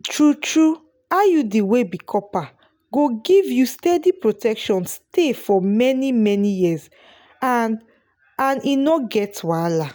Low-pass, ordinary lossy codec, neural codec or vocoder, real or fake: none; none; none; real